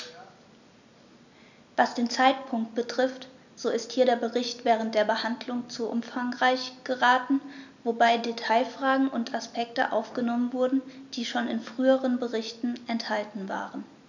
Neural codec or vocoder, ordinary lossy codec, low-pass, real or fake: none; none; 7.2 kHz; real